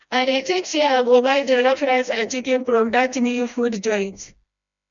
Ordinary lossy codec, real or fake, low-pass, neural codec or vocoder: none; fake; 7.2 kHz; codec, 16 kHz, 1 kbps, FreqCodec, smaller model